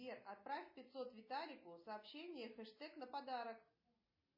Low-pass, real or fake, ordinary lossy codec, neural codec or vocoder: 5.4 kHz; real; MP3, 24 kbps; none